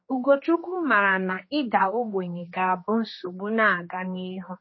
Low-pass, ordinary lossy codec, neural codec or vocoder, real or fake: 7.2 kHz; MP3, 24 kbps; codec, 16 kHz, 2 kbps, X-Codec, HuBERT features, trained on general audio; fake